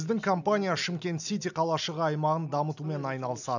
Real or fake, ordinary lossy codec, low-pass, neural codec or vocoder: real; none; 7.2 kHz; none